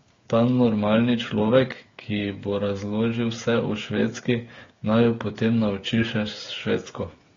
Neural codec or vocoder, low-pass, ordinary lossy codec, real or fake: codec, 16 kHz, 8 kbps, FreqCodec, smaller model; 7.2 kHz; AAC, 32 kbps; fake